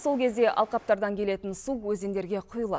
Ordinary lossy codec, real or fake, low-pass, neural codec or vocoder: none; real; none; none